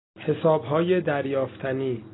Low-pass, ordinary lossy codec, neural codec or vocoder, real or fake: 7.2 kHz; AAC, 16 kbps; none; real